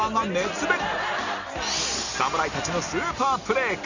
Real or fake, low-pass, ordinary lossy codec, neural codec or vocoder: real; 7.2 kHz; none; none